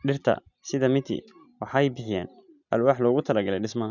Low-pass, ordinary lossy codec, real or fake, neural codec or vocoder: 7.2 kHz; none; real; none